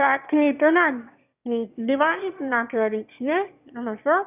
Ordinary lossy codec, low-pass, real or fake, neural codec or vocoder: none; 3.6 kHz; fake; autoencoder, 22.05 kHz, a latent of 192 numbers a frame, VITS, trained on one speaker